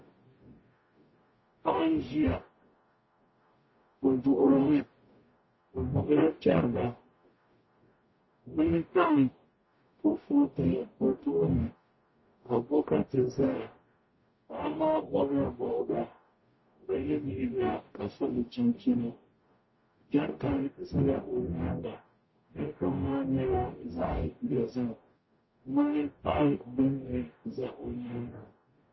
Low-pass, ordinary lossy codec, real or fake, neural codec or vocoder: 7.2 kHz; MP3, 24 kbps; fake; codec, 44.1 kHz, 0.9 kbps, DAC